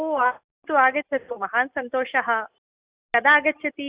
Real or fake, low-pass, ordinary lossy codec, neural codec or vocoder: real; 3.6 kHz; Opus, 64 kbps; none